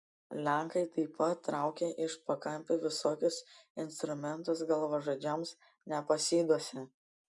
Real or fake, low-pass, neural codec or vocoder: real; 10.8 kHz; none